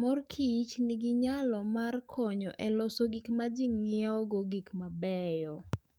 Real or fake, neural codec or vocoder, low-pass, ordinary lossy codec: fake; codec, 44.1 kHz, 7.8 kbps, DAC; 19.8 kHz; none